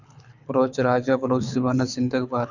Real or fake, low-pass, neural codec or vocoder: fake; 7.2 kHz; codec, 24 kHz, 6 kbps, HILCodec